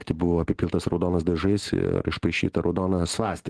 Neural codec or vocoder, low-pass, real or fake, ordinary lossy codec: none; 10.8 kHz; real; Opus, 16 kbps